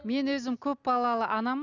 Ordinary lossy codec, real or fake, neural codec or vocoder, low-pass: none; real; none; 7.2 kHz